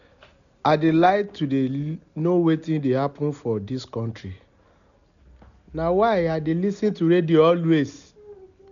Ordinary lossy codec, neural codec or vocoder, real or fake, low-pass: none; none; real; 7.2 kHz